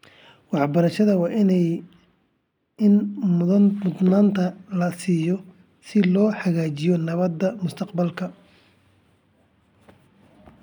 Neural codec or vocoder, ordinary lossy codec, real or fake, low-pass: none; none; real; 19.8 kHz